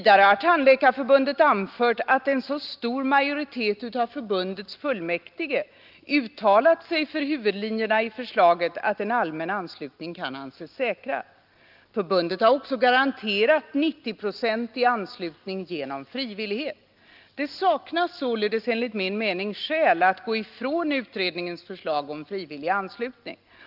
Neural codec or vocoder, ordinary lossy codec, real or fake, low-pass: none; Opus, 32 kbps; real; 5.4 kHz